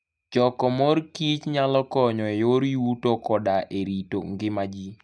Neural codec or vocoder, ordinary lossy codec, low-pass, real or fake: none; none; none; real